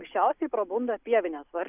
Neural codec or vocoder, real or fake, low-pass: none; real; 3.6 kHz